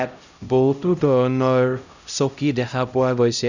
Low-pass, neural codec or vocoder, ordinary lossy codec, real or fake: 7.2 kHz; codec, 16 kHz, 0.5 kbps, X-Codec, HuBERT features, trained on LibriSpeech; none; fake